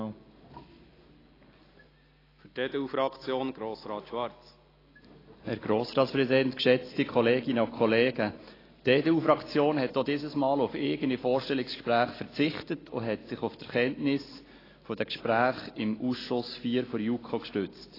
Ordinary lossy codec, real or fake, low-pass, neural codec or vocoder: AAC, 24 kbps; real; 5.4 kHz; none